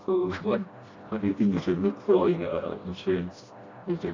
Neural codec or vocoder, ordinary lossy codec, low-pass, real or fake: codec, 16 kHz, 1 kbps, FreqCodec, smaller model; AAC, 32 kbps; 7.2 kHz; fake